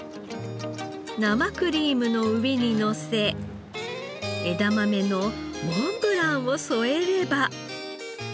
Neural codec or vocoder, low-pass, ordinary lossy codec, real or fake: none; none; none; real